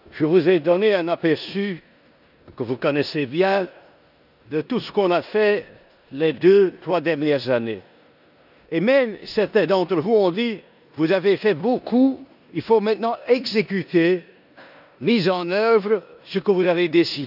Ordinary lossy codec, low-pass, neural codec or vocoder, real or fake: none; 5.4 kHz; codec, 16 kHz in and 24 kHz out, 0.9 kbps, LongCat-Audio-Codec, four codebook decoder; fake